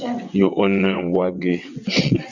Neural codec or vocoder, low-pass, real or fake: vocoder, 22.05 kHz, 80 mel bands, WaveNeXt; 7.2 kHz; fake